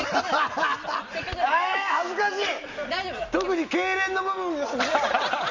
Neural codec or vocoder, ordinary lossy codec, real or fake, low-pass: none; MP3, 64 kbps; real; 7.2 kHz